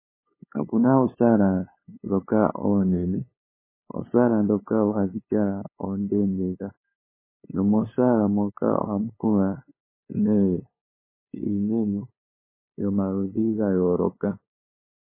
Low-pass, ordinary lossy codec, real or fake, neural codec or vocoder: 3.6 kHz; MP3, 16 kbps; fake; codec, 16 kHz, 8 kbps, FunCodec, trained on LibriTTS, 25 frames a second